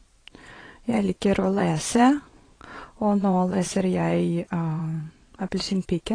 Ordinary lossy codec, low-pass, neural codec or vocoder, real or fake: AAC, 32 kbps; 9.9 kHz; vocoder, 22.05 kHz, 80 mel bands, Vocos; fake